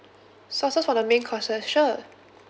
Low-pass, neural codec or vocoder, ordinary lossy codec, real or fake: none; none; none; real